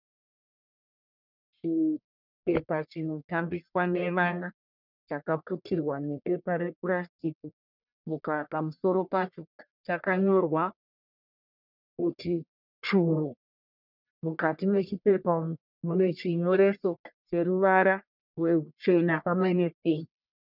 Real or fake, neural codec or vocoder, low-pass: fake; codec, 44.1 kHz, 1.7 kbps, Pupu-Codec; 5.4 kHz